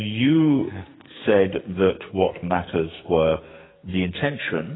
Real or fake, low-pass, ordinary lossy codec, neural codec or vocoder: fake; 7.2 kHz; AAC, 16 kbps; codec, 44.1 kHz, 2.6 kbps, SNAC